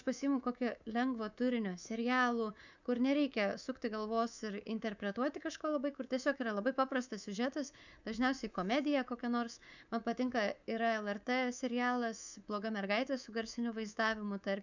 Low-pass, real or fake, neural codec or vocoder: 7.2 kHz; fake; codec, 24 kHz, 3.1 kbps, DualCodec